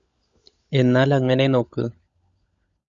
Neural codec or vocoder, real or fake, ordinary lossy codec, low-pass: codec, 16 kHz, 16 kbps, FunCodec, trained on LibriTTS, 50 frames a second; fake; Opus, 64 kbps; 7.2 kHz